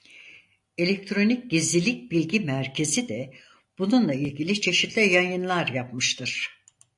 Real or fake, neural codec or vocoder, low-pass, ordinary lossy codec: real; none; 10.8 kHz; AAC, 64 kbps